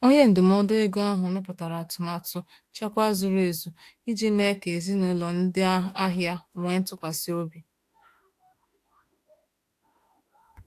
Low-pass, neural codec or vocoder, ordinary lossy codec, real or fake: 14.4 kHz; autoencoder, 48 kHz, 32 numbers a frame, DAC-VAE, trained on Japanese speech; AAC, 64 kbps; fake